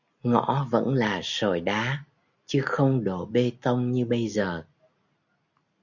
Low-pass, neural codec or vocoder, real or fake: 7.2 kHz; none; real